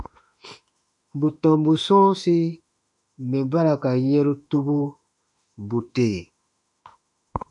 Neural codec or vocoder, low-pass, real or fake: autoencoder, 48 kHz, 32 numbers a frame, DAC-VAE, trained on Japanese speech; 10.8 kHz; fake